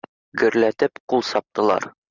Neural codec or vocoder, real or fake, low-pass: none; real; 7.2 kHz